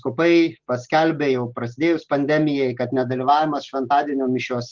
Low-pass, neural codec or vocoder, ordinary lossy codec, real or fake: 7.2 kHz; none; Opus, 16 kbps; real